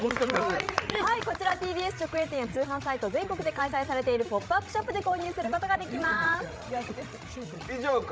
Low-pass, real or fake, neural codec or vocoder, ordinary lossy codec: none; fake; codec, 16 kHz, 16 kbps, FreqCodec, larger model; none